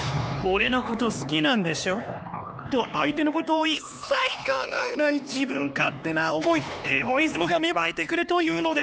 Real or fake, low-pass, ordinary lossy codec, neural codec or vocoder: fake; none; none; codec, 16 kHz, 2 kbps, X-Codec, HuBERT features, trained on LibriSpeech